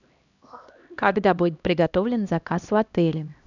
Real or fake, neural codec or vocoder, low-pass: fake; codec, 16 kHz, 1 kbps, X-Codec, HuBERT features, trained on LibriSpeech; 7.2 kHz